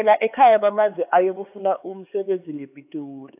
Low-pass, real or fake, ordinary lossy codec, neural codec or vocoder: 3.6 kHz; fake; AAC, 32 kbps; codec, 16 kHz, 4 kbps, X-Codec, HuBERT features, trained on LibriSpeech